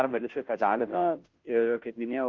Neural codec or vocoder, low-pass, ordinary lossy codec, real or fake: codec, 16 kHz, 0.5 kbps, FunCodec, trained on Chinese and English, 25 frames a second; 7.2 kHz; Opus, 24 kbps; fake